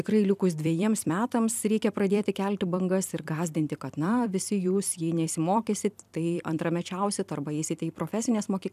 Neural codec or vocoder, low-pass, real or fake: vocoder, 44.1 kHz, 128 mel bands every 256 samples, BigVGAN v2; 14.4 kHz; fake